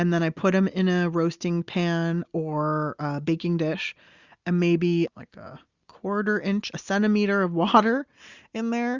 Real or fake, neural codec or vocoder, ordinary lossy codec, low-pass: real; none; Opus, 64 kbps; 7.2 kHz